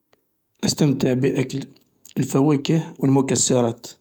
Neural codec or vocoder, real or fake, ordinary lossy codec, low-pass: codec, 44.1 kHz, 7.8 kbps, DAC; fake; MP3, 96 kbps; 19.8 kHz